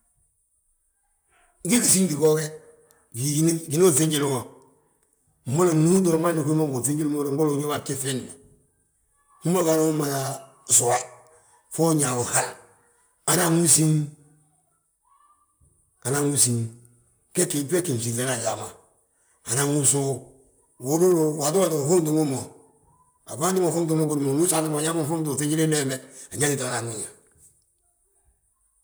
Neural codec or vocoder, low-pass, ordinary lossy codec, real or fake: vocoder, 44.1 kHz, 128 mel bands, Pupu-Vocoder; none; none; fake